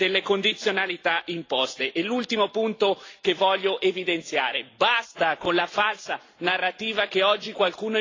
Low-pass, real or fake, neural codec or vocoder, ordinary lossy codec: 7.2 kHz; real; none; AAC, 32 kbps